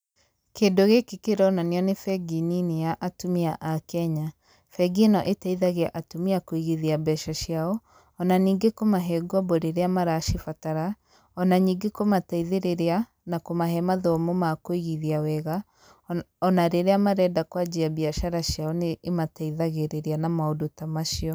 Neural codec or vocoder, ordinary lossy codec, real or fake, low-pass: none; none; real; none